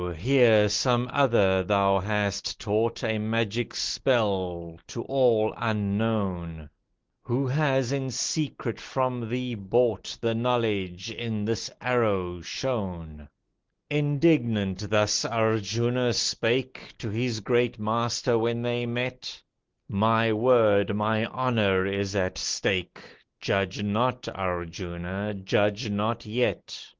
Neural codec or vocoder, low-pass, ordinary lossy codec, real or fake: none; 7.2 kHz; Opus, 16 kbps; real